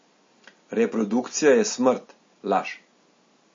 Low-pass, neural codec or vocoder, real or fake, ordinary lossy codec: 7.2 kHz; none; real; MP3, 32 kbps